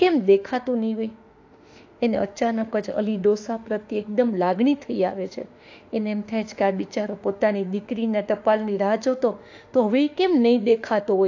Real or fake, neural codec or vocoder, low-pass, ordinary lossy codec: fake; autoencoder, 48 kHz, 32 numbers a frame, DAC-VAE, trained on Japanese speech; 7.2 kHz; AAC, 48 kbps